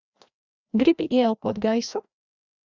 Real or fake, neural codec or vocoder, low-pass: fake; codec, 16 kHz, 1 kbps, FreqCodec, larger model; 7.2 kHz